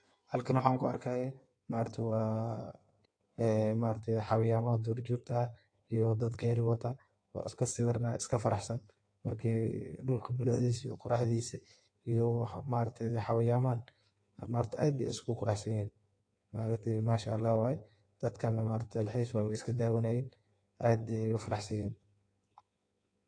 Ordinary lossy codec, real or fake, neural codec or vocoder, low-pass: AAC, 48 kbps; fake; codec, 16 kHz in and 24 kHz out, 1.1 kbps, FireRedTTS-2 codec; 9.9 kHz